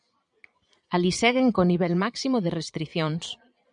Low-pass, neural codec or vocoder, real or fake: 9.9 kHz; vocoder, 22.05 kHz, 80 mel bands, Vocos; fake